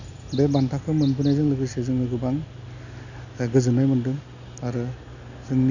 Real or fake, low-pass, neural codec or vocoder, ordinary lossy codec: real; 7.2 kHz; none; none